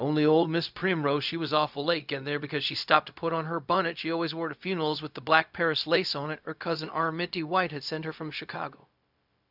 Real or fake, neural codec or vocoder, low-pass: fake; codec, 16 kHz, 0.4 kbps, LongCat-Audio-Codec; 5.4 kHz